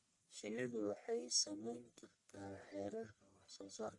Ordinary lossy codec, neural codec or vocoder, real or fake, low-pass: MP3, 48 kbps; codec, 44.1 kHz, 1.7 kbps, Pupu-Codec; fake; 10.8 kHz